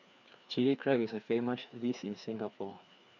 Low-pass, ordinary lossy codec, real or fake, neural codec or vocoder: 7.2 kHz; none; fake; codec, 16 kHz, 2 kbps, FreqCodec, larger model